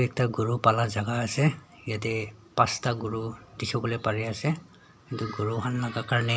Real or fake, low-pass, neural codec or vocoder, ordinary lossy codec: real; none; none; none